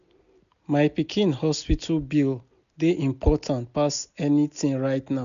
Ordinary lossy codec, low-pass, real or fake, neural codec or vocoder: none; 7.2 kHz; real; none